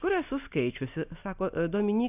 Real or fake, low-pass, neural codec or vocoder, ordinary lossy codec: real; 3.6 kHz; none; AAC, 32 kbps